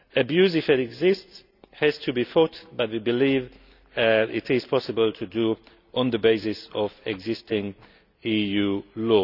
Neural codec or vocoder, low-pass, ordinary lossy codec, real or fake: none; 5.4 kHz; none; real